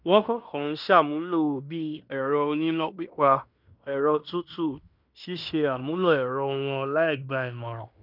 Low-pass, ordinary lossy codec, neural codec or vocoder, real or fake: 5.4 kHz; none; codec, 16 kHz in and 24 kHz out, 0.9 kbps, LongCat-Audio-Codec, fine tuned four codebook decoder; fake